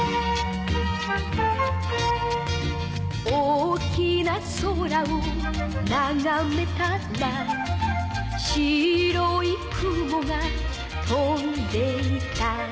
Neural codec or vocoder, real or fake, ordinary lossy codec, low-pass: none; real; none; none